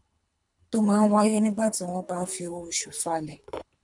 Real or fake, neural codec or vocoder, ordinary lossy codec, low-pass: fake; codec, 24 kHz, 3 kbps, HILCodec; none; 10.8 kHz